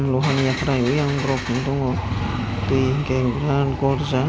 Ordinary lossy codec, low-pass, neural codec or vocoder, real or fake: none; none; none; real